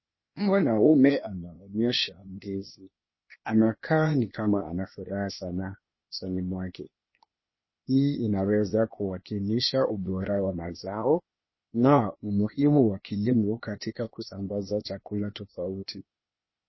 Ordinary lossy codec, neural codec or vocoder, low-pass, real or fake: MP3, 24 kbps; codec, 16 kHz, 0.8 kbps, ZipCodec; 7.2 kHz; fake